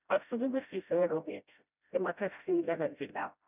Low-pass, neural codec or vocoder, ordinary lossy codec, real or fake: 3.6 kHz; codec, 16 kHz, 0.5 kbps, FreqCodec, smaller model; none; fake